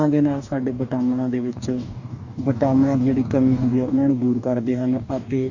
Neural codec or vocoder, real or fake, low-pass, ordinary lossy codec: codec, 44.1 kHz, 2.6 kbps, DAC; fake; 7.2 kHz; none